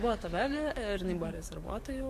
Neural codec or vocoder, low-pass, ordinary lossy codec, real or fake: codec, 44.1 kHz, 7.8 kbps, DAC; 14.4 kHz; MP3, 64 kbps; fake